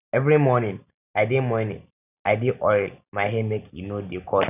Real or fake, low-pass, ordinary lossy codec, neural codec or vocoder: real; 3.6 kHz; none; none